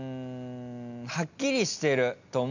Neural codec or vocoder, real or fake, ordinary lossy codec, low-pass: none; real; none; 7.2 kHz